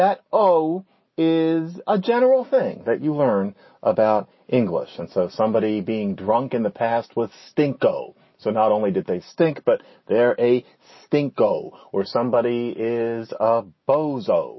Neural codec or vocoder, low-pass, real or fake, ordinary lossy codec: none; 7.2 kHz; real; MP3, 24 kbps